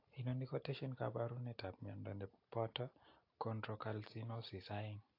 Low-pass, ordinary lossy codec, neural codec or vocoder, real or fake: 5.4 kHz; AAC, 32 kbps; none; real